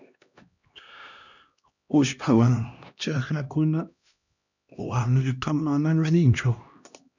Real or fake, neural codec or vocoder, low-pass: fake; codec, 16 kHz, 1 kbps, X-Codec, HuBERT features, trained on LibriSpeech; 7.2 kHz